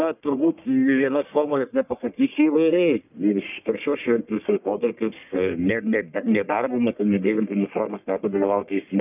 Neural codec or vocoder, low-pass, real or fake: codec, 44.1 kHz, 1.7 kbps, Pupu-Codec; 3.6 kHz; fake